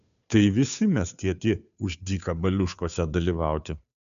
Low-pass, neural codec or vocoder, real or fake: 7.2 kHz; codec, 16 kHz, 2 kbps, FunCodec, trained on Chinese and English, 25 frames a second; fake